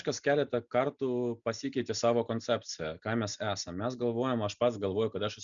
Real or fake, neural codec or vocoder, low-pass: real; none; 7.2 kHz